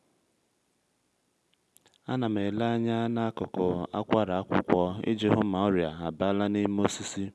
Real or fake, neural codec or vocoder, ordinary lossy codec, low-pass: real; none; none; none